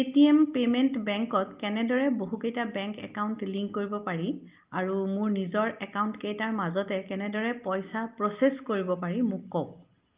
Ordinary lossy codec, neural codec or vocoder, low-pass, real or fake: Opus, 32 kbps; none; 3.6 kHz; real